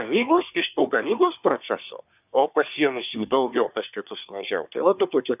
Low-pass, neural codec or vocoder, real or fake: 3.6 kHz; codec, 24 kHz, 1 kbps, SNAC; fake